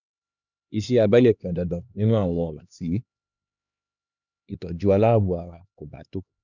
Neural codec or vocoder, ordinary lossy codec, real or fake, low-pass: codec, 16 kHz, 2 kbps, X-Codec, HuBERT features, trained on LibriSpeech; none; fake; 7.2 kHz